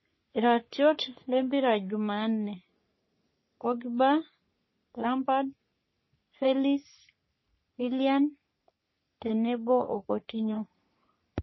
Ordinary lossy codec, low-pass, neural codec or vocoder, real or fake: MP3, 24 kbps; 7.2 kHz; codec, 44.1 kHz, 3.4 kbps, Pupu-Codec; fake